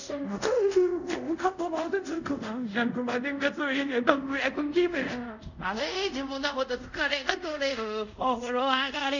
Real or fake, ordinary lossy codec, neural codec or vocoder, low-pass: fake; none; codec, 24 kHz, 0.5 kbps, DualCodec; 7.2 kHz